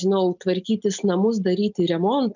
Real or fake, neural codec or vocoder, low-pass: real; none; 7.2 kHz